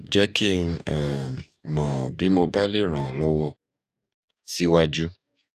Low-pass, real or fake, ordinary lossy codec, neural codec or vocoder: 14.4 kHz; fake; none; codec, 44.1 kHz, 2.6 kbps, DAC